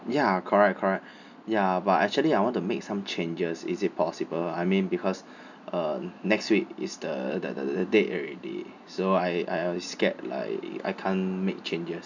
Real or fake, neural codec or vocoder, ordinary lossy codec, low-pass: real; none; none; 7.2 kHz